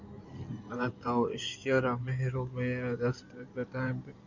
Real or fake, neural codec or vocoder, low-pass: fake; codec, 16 kHz in and 24 kHz out, 2.2 kbps, FireRedTTS-2 codec; 7.2 kHz